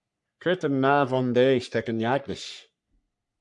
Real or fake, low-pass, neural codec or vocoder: fake; 10.8 kHz; codec, 44.1 kHz, 3.4 kbps, Pupu-Codec